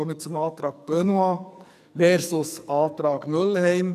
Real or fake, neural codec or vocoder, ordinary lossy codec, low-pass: fake; codec, 44.1 kHz, 2.6 kbps, SNAC; none; 14.4 kHz